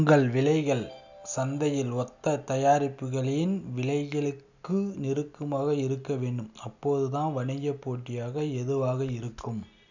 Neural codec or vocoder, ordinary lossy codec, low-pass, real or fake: none; none; 7.2 kHz; real